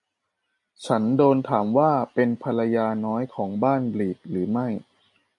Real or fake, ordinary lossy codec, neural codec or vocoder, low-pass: real; MP3, 64 kbps; none; 10.8 kHz